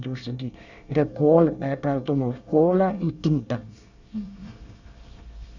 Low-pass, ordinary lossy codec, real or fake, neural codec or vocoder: 7.2 kHz; none; fake; codec, 24 kHz, 1 kbps, SNAC